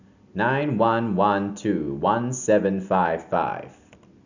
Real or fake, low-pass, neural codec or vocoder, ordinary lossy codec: real; 7.2 kHz; none; none